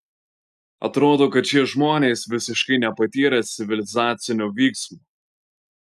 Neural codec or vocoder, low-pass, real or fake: none; 14.4 kHz; real